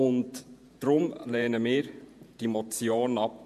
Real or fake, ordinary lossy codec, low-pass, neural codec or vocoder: fake; MP3, 64 kbps; 14.4 kHz; vocoder, 48 kHz, 128 mel bands, Vocos